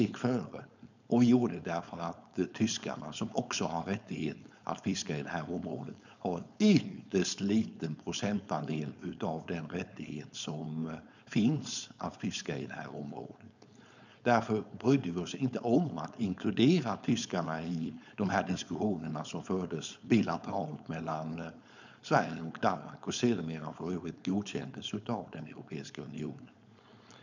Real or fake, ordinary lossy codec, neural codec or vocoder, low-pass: fake; none; codec, 16 kHz, 4.8 kbps, FACodec; 7.2 kHz